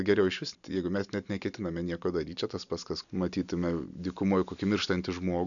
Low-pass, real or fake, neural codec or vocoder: 7.2 kHz; real; none